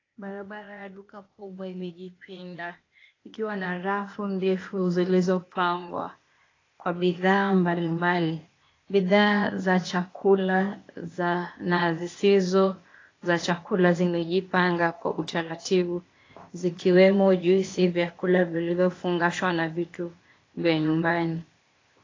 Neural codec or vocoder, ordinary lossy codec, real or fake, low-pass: codec, 16 kHz, 0.8 kbps, ZipCodec; AAC, 32 kbps; fake; 7.2 kHz